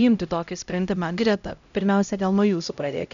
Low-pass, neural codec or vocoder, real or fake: 7.2 kHz; codec, 16 kHz, 0.5 kbps, X-Codec, HuBERT features, trained on LibriSpeech; fake